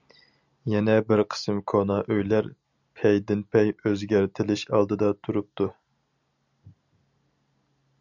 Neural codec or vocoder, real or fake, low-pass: none; real; 7.2 kHz